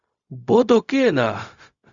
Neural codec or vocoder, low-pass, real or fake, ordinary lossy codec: codec, 16 kHz, 0.4 kbps, LongCat-Audio-Codec; 7.2 kHz; fake; Opus, 64 kbps